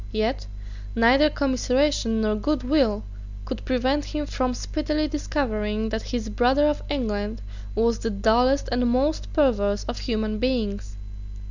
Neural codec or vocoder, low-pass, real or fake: none; 7.2 kHz; real